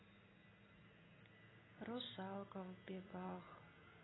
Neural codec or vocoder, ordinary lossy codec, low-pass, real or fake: none; AAC, 16 kbps; 7.2 kHz; real